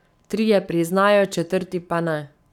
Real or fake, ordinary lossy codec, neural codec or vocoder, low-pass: fake; none; codec, 44.1 kHz, 7.8 kbps, Pupu-Codec; 19.8 kHz